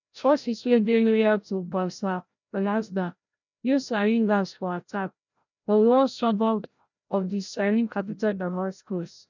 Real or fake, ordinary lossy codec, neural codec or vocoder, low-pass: fake; none; codec, 16 kHz, 0.5 kbps, FreqCodec, larger model; 7.2 kHz